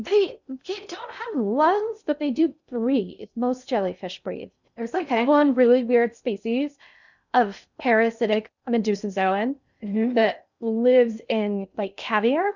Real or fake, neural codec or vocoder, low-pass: fake; codec, 16 kHz in and 24 kHz out, 0.6 kbps, FocalCodec, streaming, 2048 codes; 7.2 kHz